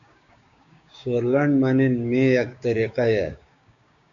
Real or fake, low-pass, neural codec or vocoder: fake; 7.2 kHz; codec, 16 kHz, 6 kbps, DAC